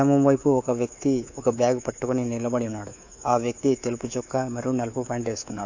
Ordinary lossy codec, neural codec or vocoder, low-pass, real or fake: AAC, 48 kbps; codec, 24 kHz, 3.1 kbps, DualCodec; 7.2 kHz; fake